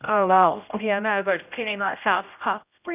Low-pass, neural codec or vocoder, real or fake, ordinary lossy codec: 3.6 kHz; codec, 16 kHz, 0.5 kbps, X-Codec, HuBERT features, trained on general audio; fake; none